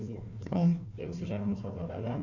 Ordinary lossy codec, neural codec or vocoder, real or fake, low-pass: none; codec, 16 kHz, 1 kbps, FunCodec, trained on Chinese and English, 50 frames a second; fake; 7.2 kHz